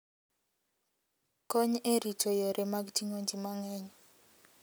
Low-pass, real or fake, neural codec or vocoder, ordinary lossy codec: none; real; none; none